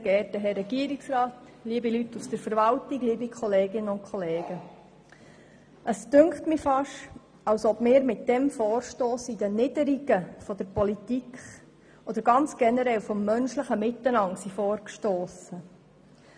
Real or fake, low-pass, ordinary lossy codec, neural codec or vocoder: real; 9.9 kHz; none; none